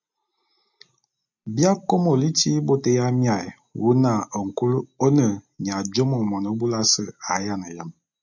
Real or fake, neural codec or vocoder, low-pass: real; none; 7.2 kHz